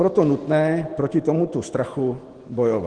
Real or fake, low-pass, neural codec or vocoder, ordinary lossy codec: real; 9.9 kHz; none; Opus, 16 kbps